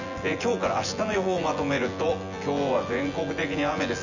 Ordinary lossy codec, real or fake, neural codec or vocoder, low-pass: none; fake; vocoder, 24 kHz, 100 mel bands, Vocos; 7.2 kHz